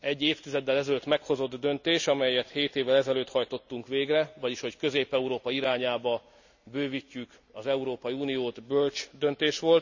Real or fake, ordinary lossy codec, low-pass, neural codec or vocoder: real; none; 7.2 kHz; none